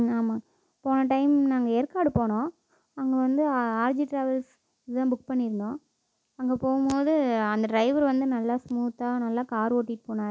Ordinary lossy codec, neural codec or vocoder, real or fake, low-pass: none; none; real; none